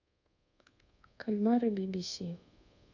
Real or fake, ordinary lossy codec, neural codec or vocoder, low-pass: fake; none; codec, 24 kHz, 1.2 kbps, DualCodec; 7.2 kHz